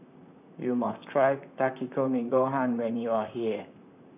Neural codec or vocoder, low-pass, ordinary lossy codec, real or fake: vocoder, 44.1 kHz, 128 mel bands, Pupu-Vocoder; 3.6 kHz; none; fake